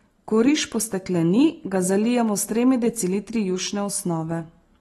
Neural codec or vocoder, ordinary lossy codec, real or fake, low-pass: none; AAC, 32 kbps; real; 19.8 kHz